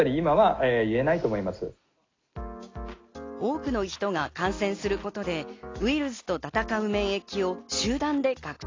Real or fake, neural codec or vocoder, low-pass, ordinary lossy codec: real; none; 7.2 kHz; AAC, 32 kbps